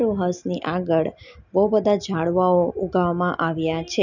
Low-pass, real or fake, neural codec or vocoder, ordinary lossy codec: 7.2 kHz; real; none; none